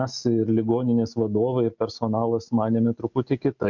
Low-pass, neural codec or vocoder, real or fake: 7.2 kHz; none; real